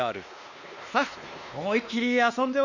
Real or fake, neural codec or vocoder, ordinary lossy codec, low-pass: fake; codec, 16 kHz, 2 kbps, X-Codec, WavLM features, trained on Multilingual LibriSpeech; Opus, 64 kbps; 7.2 kHz